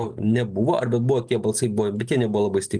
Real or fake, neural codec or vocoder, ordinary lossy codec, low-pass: real; none; Opus, 24 kbps; 9.9 kHz